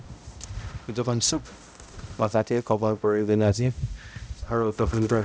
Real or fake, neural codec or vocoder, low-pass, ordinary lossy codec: fake; codec, 16 kHz, 0.5 kbps, X-Codec, HuBERT features, trained on balanced general audio; none; none